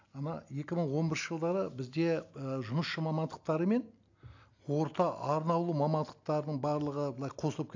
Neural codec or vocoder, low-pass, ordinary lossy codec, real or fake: none; 7.2 kHz; AAC, 48 kbps; real